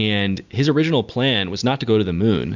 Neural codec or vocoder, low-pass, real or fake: none; 7.2 kHz; real